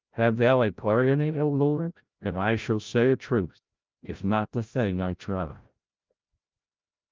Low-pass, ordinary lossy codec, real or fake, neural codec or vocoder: 7.2 kHz; Opus, 24 kbps; fake; codec, 16 kHz, 0.5 kbps, FreqCodec, larger model